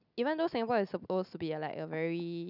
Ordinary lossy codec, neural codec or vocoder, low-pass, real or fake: none; none; 5.4 kHz; real